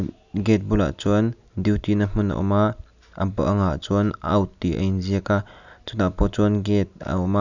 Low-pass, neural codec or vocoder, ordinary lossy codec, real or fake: 7.2 kHz; none; none; real